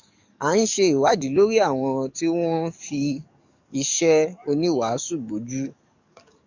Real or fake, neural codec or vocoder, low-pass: fake; codec, 44.1 kHz, 7.8 kbps, DAC; 7.2 kHz